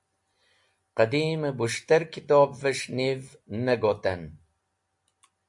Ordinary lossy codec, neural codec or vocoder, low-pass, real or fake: MP3, 64 kbps; none; 10.8 kHz; real